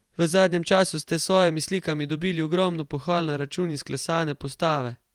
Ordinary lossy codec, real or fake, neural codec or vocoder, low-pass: Opus, 32 kbps; fake; vocoder, 48 kHz, 128 mel bands, Vocos; 19.8 kHz